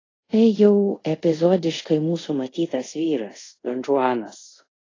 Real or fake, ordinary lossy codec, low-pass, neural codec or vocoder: fake; AAC, 32 kbps; 7.2 kHz; codec, 24 kHz, 0.5 kbps, DualCodec